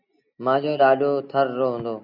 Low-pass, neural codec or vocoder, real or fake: 5.4 kHz; none; real